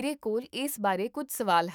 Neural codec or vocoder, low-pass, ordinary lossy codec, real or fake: autoencoder, 48 kHz, 128 numbers a frame, DAC-VAE, trained on Japanese speech; none; none; fake